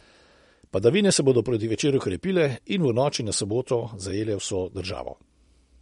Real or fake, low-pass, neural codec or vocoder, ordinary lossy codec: real; 19.8 kHz; none; MP3, 48 kbps